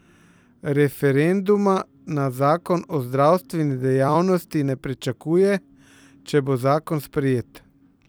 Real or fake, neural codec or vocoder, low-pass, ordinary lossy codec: fake; vocoder, 44.1 kHz, 128 mel bands every 256 samples, BigVGAN v2; none; none